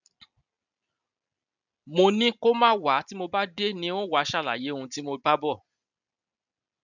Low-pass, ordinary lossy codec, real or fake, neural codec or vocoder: 7.2 kHz; none; real; none